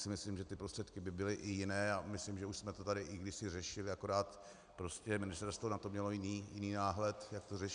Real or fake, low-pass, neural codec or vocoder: real; 9.9 kHz; none